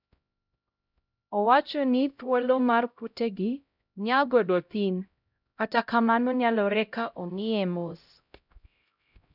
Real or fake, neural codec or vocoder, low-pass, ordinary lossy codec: fake; codec, 16 kHz, 0.5 kbps, X-Codec, HuBERT features, trained on LibriSpeech; 5.4 kHz; none